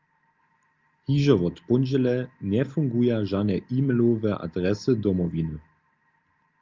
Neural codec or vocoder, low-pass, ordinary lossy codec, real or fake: none; 7.2 kHz; Opus, 32 kbps; real